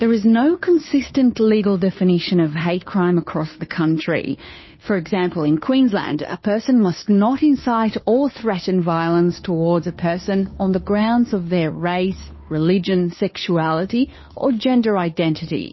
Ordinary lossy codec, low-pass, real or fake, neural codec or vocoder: MP3, 24 kbps; 7.2 kHz; fake; codec, 16 kHz, 2 kbps, FunCodec, trained on Chinese and English, 25 frames a second